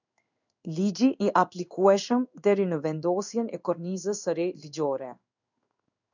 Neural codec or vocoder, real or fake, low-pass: codec, 16 kHz in and 24 kHz out, 1 kbps, XY-Tokenizer; fake; 7.2 kHz